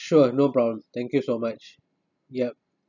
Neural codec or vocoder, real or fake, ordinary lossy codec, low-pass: none; real; none; 7.2 kHz